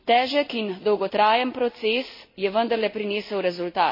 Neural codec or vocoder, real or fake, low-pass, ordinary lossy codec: none; real; 5.4 kHz; MP3, 24 kbps